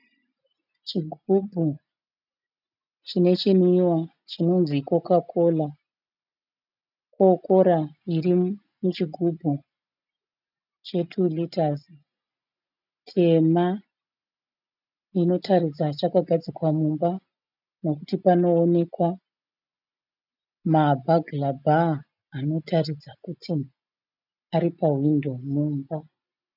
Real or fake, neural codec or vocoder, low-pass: real; none; 5.4 kHz